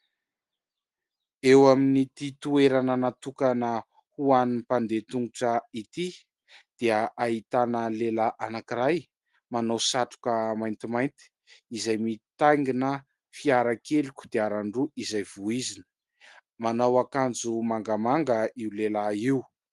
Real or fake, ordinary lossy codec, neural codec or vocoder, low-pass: real; Opus, 24 kbps; none; 10.8 kHz